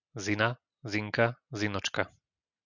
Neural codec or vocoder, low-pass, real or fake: none; 7.2 kHz; real